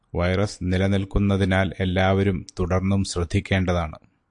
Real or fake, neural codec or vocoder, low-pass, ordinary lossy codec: real; none; 9.9 kHz; AAC, 64 kbps